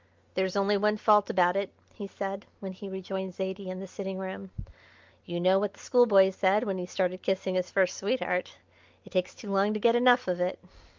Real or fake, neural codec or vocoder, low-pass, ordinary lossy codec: real; none; 7.2 kHz; Opus, 32 kbps